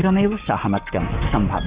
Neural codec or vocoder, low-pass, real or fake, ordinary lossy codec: codec, 44.1 kHz, 7.8 kbps, Pupu-Codec; 3.6 kHz; fake; Opus, 64 kbps